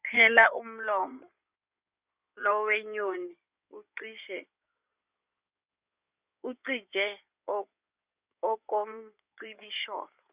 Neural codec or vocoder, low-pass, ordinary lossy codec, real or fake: codec, 16 kHz in and 24 kHz out, 2.2 kbps, FireRedTTS-2 codec; 3.6 kHz; Opus, 64 kbps; fake